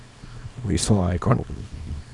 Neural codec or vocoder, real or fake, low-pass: codec, 24 kHz, 0.9 kbps, WavTokenizer, small release; fake; 10.8 kHz